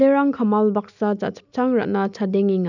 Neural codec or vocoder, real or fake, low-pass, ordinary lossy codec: none; real; 7.2 kHz; none